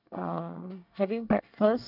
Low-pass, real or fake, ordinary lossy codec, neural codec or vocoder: 5.4 kHz; fake; none; codec, 24 kHz, 1 kbps, SNAC